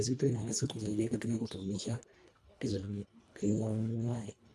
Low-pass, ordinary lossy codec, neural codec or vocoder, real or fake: none; none; codec, 24 kHz, 1.5 kbps, HILCodec; fake